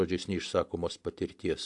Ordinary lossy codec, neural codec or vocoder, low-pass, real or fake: AAC, 64 kbps; none; 10.8 kHz; real